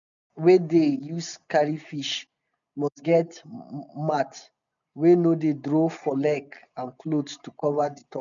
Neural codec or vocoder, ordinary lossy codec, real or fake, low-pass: none; none; real; 7.2 kHz